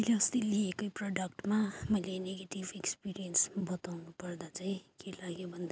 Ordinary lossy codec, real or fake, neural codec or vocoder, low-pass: none; real; none; none